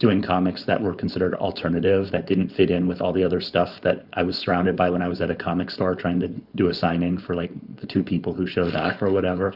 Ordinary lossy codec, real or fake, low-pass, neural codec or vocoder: Opus, 64 kbps; fake; 5.4 kHz; codec, 16 kHz, 4.8 kbps, FACodec